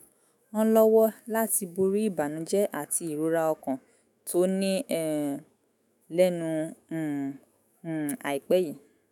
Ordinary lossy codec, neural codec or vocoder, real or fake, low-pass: none; autoencoder, 48 kHz, 128 numbers a frame, DAC-VAE, trained on Japanese speech; fake; 19.8 kHz